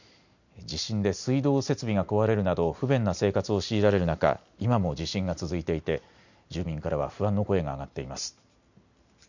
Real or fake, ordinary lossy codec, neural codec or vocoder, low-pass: real; none; none; 7.2 kHz